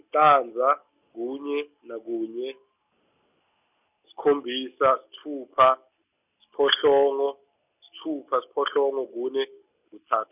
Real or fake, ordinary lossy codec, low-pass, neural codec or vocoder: real; none; 3.6 kHz; none